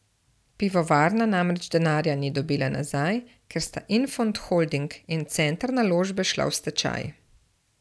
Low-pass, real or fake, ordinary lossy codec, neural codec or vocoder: none; real; none; none